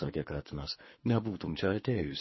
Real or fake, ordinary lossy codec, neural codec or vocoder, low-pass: fake; MP3, 24 kbps; codec, 24 kHz, 3 kbps, HILCodec; 7.2 kHz